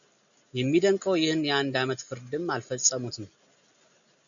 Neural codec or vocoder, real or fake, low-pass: none; real; 7.2 kHz